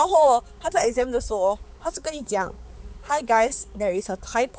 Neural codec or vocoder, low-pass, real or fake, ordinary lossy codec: codec, 16 kHz, 4 kbps, X-Codec, HuBERT features, trained on balanced general audio; none; fake; none